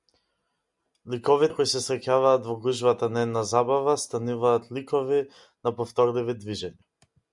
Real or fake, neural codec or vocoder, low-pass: real; none; 10.8 kHz